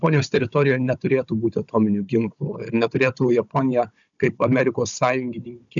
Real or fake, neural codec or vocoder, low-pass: fake; codec, 16 kHz, 16 kbps, FunCodec, trained on Chinese and English, 50 frames a second; 7.2 kHz